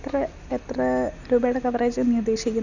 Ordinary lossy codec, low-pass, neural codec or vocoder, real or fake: none; 7.2 kHz; none; real